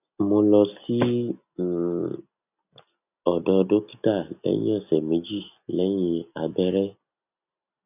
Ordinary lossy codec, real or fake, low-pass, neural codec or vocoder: none; real; 3.6 kHz; none